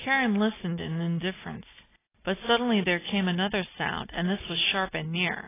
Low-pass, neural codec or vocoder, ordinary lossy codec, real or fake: 3.6 kHz; none; AAC, 16 kbps; real